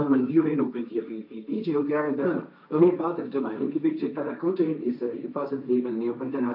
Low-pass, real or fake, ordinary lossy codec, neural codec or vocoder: 5.4 kHz; fake; none; codec, 16 kHz, 1.1 kbps, Voila-Tokenizer